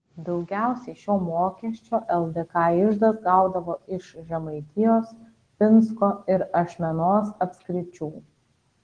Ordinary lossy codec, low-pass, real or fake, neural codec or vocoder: Opus, 16 kbps; 9.9 kHz; real; none